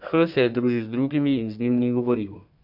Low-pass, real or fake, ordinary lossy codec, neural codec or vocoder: 5.4 kHz; fake; MP3, 48 kbps; codec, 32 kHz, 1.9 kbps, SNAC